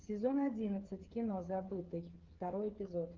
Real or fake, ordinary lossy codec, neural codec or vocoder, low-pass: fake; Opus, 16 kbps; codec, 16 kHz, 8 kbps, FreqCodec, smaller model; 7.2 kHz